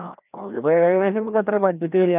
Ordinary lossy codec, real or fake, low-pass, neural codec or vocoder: none; fake; 3.6 kHz; codec, 16 kHz, 1 kbps, FreqCodec, larger model